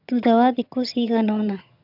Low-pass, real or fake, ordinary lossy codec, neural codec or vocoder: 5.4 kHz; fake; none; vocoder, 22.05 kHz, 80 mel bands, HiFi-GAN